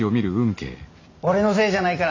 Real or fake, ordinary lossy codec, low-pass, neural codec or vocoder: real; AAC, 48 kbps; 7.2 kHz; none